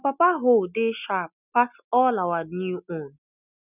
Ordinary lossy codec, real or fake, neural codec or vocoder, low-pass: none; real; none; 3.6 kHz